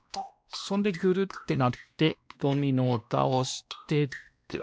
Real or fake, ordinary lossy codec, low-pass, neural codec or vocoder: fake; none; none; codec, 16 kHz, 1 kbps, X-Codec, WavLM features, trained on Multilingual LibriSpeech